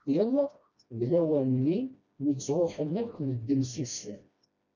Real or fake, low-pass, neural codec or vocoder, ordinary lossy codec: fake; 7.2 kHz; codec, 16 kHz, 1 kbps, FreqCodec, smaller model; MP3, 64 kbps